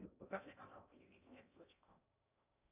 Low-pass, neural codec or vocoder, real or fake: 3.6 kHz; codec, 16 kHz in and 24 kHz out, 0.6 kbps, FocalCodec, streaming, 4096 codes; fake